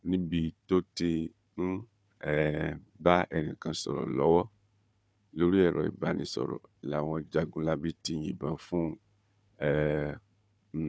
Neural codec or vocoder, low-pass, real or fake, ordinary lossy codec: codec, 16 kHz, 4 kbps, FunCodec, trained on Chinese and English, 50 frames a second; none; fake; none